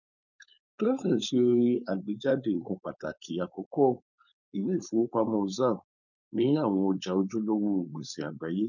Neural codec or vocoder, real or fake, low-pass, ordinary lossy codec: codec, 16 kHz, 4.8 kbps, FACodec; fake; 7.2 kHz; none